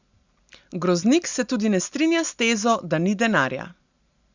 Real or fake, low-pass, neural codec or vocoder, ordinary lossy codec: real; 7.2 kHz; none; Opus, 64 kbps